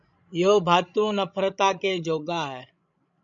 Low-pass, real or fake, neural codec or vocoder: 7.2 kHz; fake; codec, 16 kHz, 16 kbps, FreqCodec, larger model